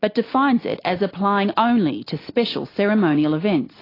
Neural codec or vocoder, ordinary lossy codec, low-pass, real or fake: none; AAC, 24 kbps; 5.4 kHz; real